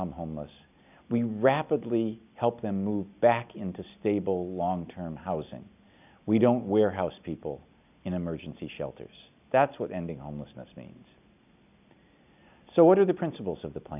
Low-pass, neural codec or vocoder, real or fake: 3.6 kHz; none; real